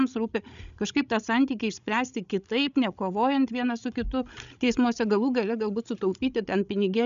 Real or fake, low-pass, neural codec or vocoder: fake; 7.2 kHz; codec, 16 kHz, 16 kbps, FreqCodec, larger model